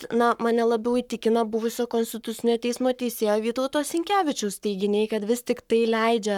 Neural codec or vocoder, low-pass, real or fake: codec, 44.1 kHz, 7.8 kbps, Pupu-Codec; 19.8 kHz; fake